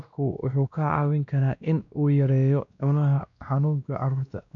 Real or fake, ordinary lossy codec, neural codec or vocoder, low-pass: fake; none; codec, 16 kHz, 1 kbps, X-Codec, WavLM features, trained on Multilingual LibriSpeech; 7.2 kHz